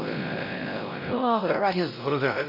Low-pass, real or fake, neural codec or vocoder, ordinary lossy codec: 5.4 kHz; fake; codec, 16 kHz, 0.5 kbps, X-Codec, WavLM features, trained on Multilingual LibriSpeech; none